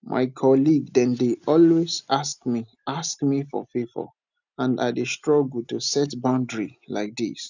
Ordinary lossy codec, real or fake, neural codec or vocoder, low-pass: none; real; none; 7.2 kHz